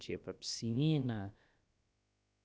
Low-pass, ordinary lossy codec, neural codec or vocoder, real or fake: none; none; codec, 16 kHz, about 1 kbps, DyCAST, with the encoder's durations; fake